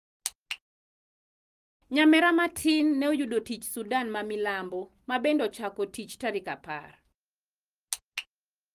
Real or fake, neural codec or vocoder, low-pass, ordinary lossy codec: real; none; 14.4 kHz; Opus, 32 kbps